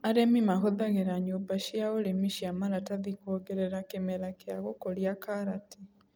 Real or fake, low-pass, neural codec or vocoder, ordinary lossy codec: real; none; none; none